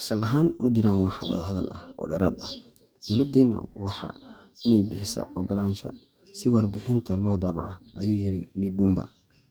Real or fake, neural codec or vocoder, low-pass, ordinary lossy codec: fake; codec, 44.1 kHz, 2.6 kbps, DAC; none; none